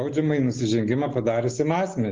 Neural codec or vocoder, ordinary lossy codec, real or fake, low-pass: none; Opus, 16 kbps; real; 7.2 kHz